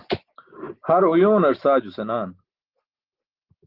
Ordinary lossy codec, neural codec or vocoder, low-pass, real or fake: Opus, 16 kbps; none; 5.4 kHz; real